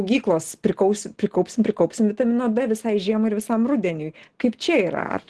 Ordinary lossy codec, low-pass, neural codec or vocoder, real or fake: Opus, 16 kbps; 10.8 kHz; none; real